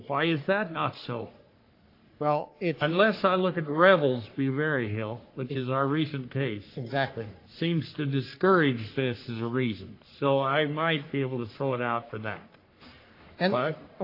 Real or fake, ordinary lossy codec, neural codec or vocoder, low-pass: fake; AAC, 32 kbps; codec, 44.1 kHz, 3.4 kbps, Pupu-Codec; 5.4 kHz